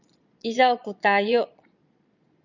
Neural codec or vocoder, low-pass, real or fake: vocoder, 22.05 kHz, 80 mel bands, Vocos; 7.2 kHz; fake